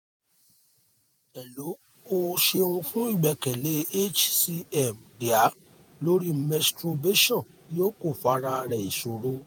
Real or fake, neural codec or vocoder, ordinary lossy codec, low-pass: fake; vocoder, 48 kHz, 128 mel bands, Vocos; none; none